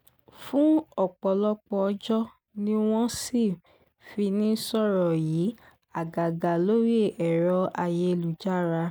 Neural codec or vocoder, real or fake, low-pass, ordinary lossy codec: none; real; none; none